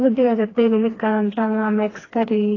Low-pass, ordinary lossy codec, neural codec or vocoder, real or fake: 7.2 kHz; AAC, 32 kbps; codec, 16 kHz, 2 kbps, FreqCodec, smaller model; fake